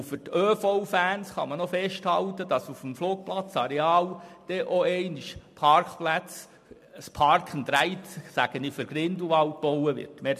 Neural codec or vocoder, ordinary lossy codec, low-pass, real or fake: none; MP3, 64 kbps; 14.4 kHz; real